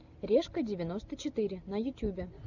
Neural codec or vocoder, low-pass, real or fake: none; 7.2 kHz; real